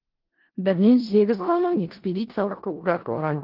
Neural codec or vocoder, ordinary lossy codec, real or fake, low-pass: codec, 16 kHz in and 24 kHz out, 0.4 kbps, LongCat-Audio-Codec, four codebook decoder; Opus, 16 kbps; fake; 5.4 kHz